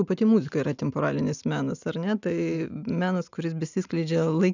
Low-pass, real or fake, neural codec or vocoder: 7.2 kHz; real; none